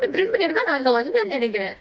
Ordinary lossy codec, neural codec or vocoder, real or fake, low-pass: none; codec, 16 kHz, 1 kbps, FreqCodec, smaller model; fake; none